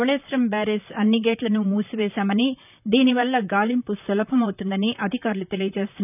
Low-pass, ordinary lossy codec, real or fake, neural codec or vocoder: 3.6 kHz; none; fake; vocoder, 44.1 kHz, 128 mel bands, Pupu-Vocoder